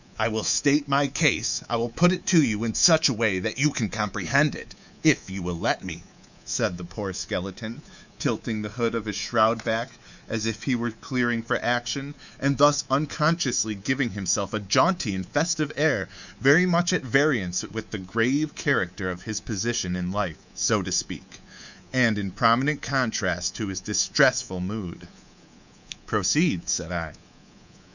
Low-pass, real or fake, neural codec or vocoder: 7.2 kHz; fake; codec, 24 kHz, 3.1 kbps, DualCodec